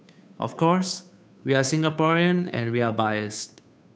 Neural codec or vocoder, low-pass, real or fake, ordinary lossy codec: codec, 16 kHz, 2 kbps, FunCodec, trained on Chinese and English, 25 frames a second; none; fake; none